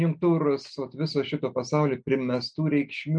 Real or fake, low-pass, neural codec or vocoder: real; 9.9 kHz; none